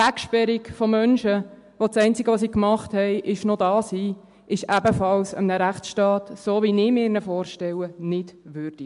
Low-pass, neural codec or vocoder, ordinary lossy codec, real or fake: 10.8 kHz; none; MP3, 64 kbps; real